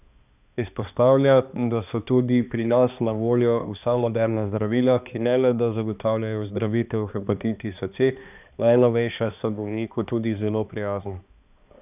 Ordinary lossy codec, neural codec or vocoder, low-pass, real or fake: none; codec, 16 kHz, 2 kbps, X-Codec, HuBERT features, trained on balanced general audio; 3.6 kHz; fake